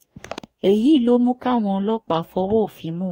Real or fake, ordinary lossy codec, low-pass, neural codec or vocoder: fake; AAC, 48 kbps; 19.8 kHz; codec, 44.1 kHz, 2.6 kbps, DAC